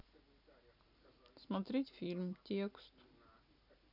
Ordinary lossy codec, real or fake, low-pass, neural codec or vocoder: none; real; 5.4 kHz; none